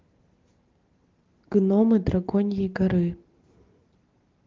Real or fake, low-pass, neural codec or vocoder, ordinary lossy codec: real; 7.2 kHz; none; Opus, 16 kbps